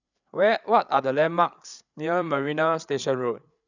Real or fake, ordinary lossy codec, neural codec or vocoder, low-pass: fake; none; codec, 16 kHz, 8 kbps, FreqCodec, larger model; 7.2 kHz